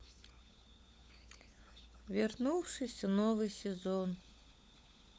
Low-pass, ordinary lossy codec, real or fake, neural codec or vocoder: none; none; fake; codec, 16 kHz, 16 kbps, FunCodec, trained on LibriTTS, 50 frames a second